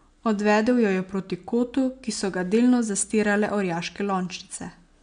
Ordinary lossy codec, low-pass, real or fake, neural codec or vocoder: MP3, 64 kbps; 9.9 kHz; real; none